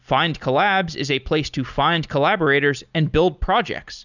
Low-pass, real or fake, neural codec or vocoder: 7.2 kHz; real; none